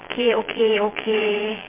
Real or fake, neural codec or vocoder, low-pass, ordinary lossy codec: fake; vocoder, 22.05 kHz, 80 mel bands, Vocos; 3.6 kHz; MP3, 24 kbps